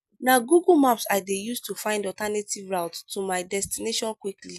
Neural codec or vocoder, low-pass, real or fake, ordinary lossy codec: none; 14.4 kHz; real; none